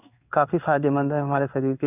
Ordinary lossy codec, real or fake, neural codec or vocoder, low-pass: none; fake; codec, 16 kHz in and 24 kHz out, 1 kbps, XY-Tokenizer; 3.6 kHz